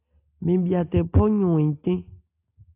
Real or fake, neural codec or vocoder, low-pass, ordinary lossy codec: real; none; 3.6 kHz; AAC, 32 kbps